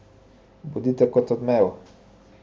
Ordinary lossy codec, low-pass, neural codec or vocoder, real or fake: none; none; none; real